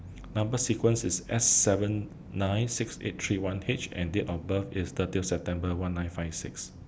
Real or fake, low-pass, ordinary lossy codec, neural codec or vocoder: real; none; none; none